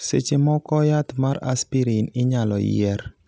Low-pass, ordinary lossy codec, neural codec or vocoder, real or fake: none; none; none; real